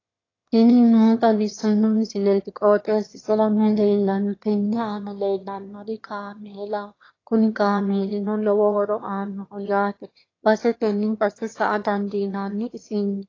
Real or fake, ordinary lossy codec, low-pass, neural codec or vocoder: fake; AAC, 32 kbps; 7.2 kHz; autoencoder, 22.05 kHz, a latent of 192 numbers a frame, VITS, trained on one speaker